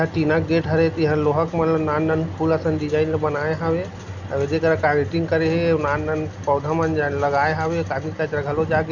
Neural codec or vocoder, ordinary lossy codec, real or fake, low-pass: none; none; real; 7.2 kHz